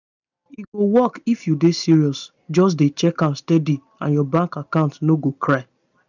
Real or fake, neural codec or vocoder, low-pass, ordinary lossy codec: real; none; 7.2 kHz; none